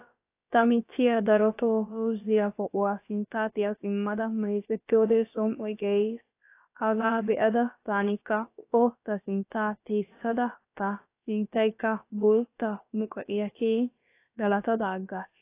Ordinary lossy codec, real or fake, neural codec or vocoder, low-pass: AAC, 24 kbps; fake; codec, 16 kHz, about 1 kbps, DyCAST, with the encoder's durations; 3.6 kHz